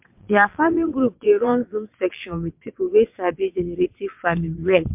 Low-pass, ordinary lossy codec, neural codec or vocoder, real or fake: 3.6 kHz; MP3, 32 kbps; vocoder, 22.05 kHz, 80 mel bands, WaveNeXt; fake